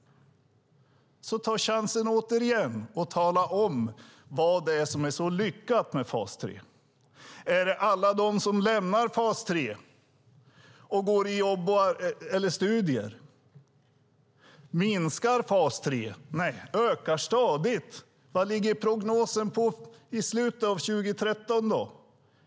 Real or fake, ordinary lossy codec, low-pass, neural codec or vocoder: real; none; none; none